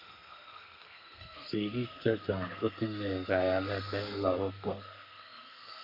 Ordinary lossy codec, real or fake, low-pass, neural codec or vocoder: MP3, 48 kbps; fake; 5.4 kHz; codec, 32 kHz, 1.9 kbps, SNAC